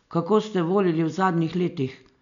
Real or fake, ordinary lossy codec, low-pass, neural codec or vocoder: real; none; 7.2 kHz; none